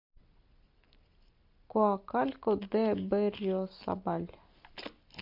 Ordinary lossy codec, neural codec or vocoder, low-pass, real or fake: MP3, 48 kbps; none; 5.4 kHz; real